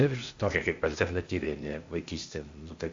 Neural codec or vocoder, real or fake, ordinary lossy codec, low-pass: codec, 16 kHz, 0.8 kbps, ZipCodec; fake; AAC, 48 kbps; 7.2 kHz